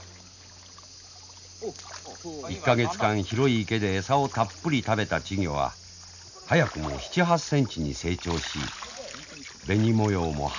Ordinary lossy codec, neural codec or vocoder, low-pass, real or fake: none; none; 7.2 kHz; real